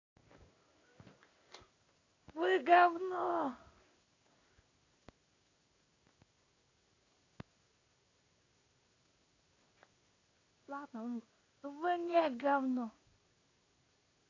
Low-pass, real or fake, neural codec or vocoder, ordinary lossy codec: 7.2 kHz; fake; codec, 16 kHz in and 24 kHz out, 1 kbps, XY-Tokenizer; AAC, 32 kbps